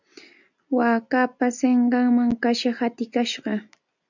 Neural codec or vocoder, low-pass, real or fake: none; 7.2 kHz; real